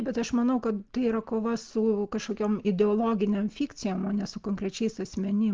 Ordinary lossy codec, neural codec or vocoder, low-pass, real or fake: Opus, 32 kbps; none; 7.2 kHz; real